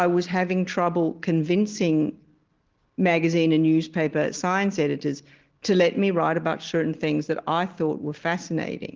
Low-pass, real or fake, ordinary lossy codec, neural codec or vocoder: 7.2 kHz; real; Opus, 16 kbps; none